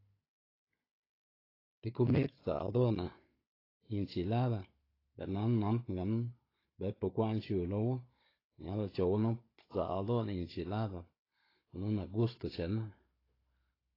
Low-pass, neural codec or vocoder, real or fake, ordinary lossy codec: 5.4 kHz; codec, 16 kHz, 16 kbps, FunCodec, trained on Chinese and English, 50 frames a second; fake; AAC, 24 kbps